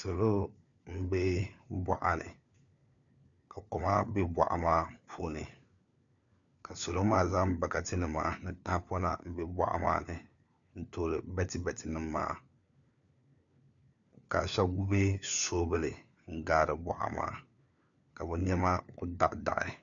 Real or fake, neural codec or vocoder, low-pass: fake; codec, 16 kHz, 4 kbps, FunCodec, trained on LibriTTS, 50 frames a second; 7.2 kHz